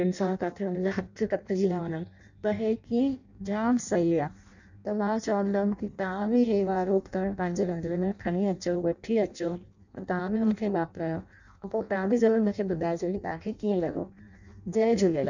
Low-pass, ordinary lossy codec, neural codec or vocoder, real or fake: 7.2 kHz; none; codec, 16 kHz in and 24 kHz out, 0.6 kbps, FireRedTTS-2 codec; fake